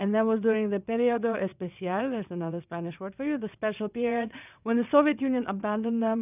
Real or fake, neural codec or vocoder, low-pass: fake; vocoder, 22.05 kHz, 80 mel bands, WaveNeXt; 3.6 kHz